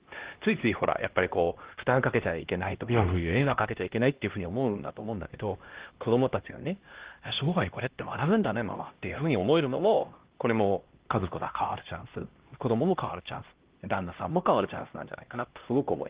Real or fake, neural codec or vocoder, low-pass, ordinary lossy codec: fake; codec, 16 kHz, 1 kbps, X-Codec, HuBERT features, trained on LibriSpeech; 3.6 kHz; Opus, 16 kbps